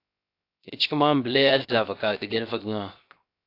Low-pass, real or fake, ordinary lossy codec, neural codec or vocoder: 5.4 kHz; fake; AAC, 32 kbps; codec, 16 kHz, 0.7 kbps, FocalCodec